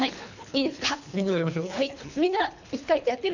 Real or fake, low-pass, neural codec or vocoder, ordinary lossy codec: fake; 7.2 kHz; codec, 24 kHz, 3 kbps, HILCodec; none